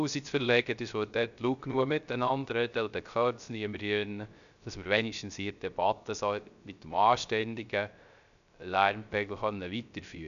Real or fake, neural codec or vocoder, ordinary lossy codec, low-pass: fake; codec, 16 kHz, 0.3 kbps, FocalCodec; none; 7.2 kHz